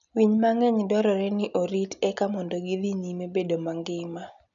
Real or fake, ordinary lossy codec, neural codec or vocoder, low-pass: real; none; none; 7.2 kHz